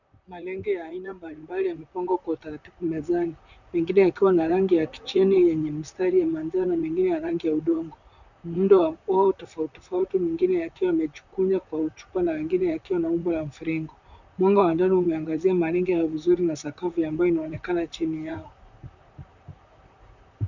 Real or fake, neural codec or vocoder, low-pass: fake; vocoder, 44.1 kHz, 128 mel bands, Pupu-Vocoder; 7.2 kHz